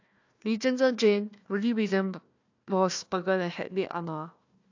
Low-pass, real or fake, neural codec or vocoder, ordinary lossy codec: 7.2 kHz; fake; codec, 16 kHz, 1 kbps, FunCodec, trained on Chinese and English, 50 frames a second; AAC, 48 kbps